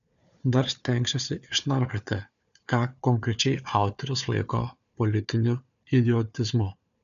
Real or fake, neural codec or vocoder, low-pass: fake; codec, 16 kHz, 4 kbps, FunCodec, trained on Chinese and English, 50 frames a second; 7.2 kHz